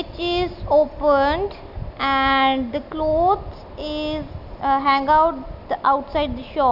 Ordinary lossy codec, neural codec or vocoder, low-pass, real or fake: none; none; 5.4 kHz; real